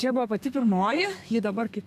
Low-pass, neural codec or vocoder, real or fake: 14.4 kHz; codec, 32 kHz, 1.9 kbps, SNAC; fake